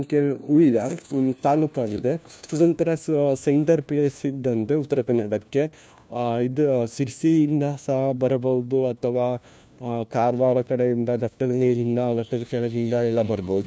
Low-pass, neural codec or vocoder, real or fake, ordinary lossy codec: none; codec, 16 kHz, 1 kbps, FunCodec, trained on LibriTTS, 50 frames a second; fake; none